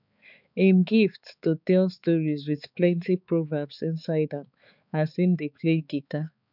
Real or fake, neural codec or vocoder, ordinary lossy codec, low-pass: fake; codec, 16 kHz, 4 kbps, X-Codec, HuBERT features, trained on balanced general audio; none; 5.4 kHz